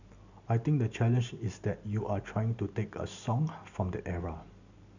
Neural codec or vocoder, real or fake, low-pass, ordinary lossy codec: none; real; 7.2 kHz; none